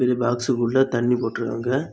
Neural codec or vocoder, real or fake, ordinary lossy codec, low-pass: none; real; none; none